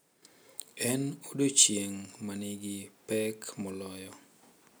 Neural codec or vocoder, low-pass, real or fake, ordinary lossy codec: none; none; real; none